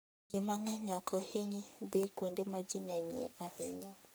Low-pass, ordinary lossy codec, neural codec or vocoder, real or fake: none; none; codec, 44.1 kHz, 3.4 kbps, Pupu-Codec; fake